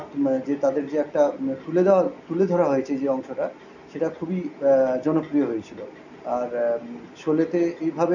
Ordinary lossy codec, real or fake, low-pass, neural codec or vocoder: none; real; 7.2 kHz; none